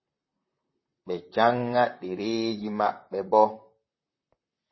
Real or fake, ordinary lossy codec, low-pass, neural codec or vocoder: fake; MP3, 24 kbps; 7.2 kHz; vocoder, 44.1 kHz, 128 mel bands, Pupu-Vocoder